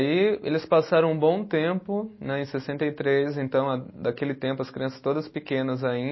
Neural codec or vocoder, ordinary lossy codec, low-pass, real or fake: none; MP3, 24 kbps; 7.2 kHz; real